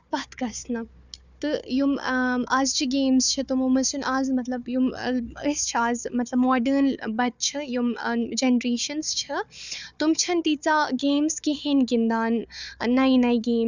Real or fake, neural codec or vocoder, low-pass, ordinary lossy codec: fake; codec, 16 kHz, 4 kbps, FunCodec, trained on Chinese and English, 50 frames a second; 7.2 kHz; none